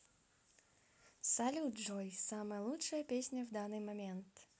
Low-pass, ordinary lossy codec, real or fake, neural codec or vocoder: none; none; real; none